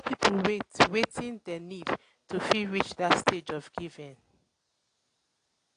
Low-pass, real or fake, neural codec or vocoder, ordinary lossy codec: 9.9 kHz; real; none; AAC, 48 kbps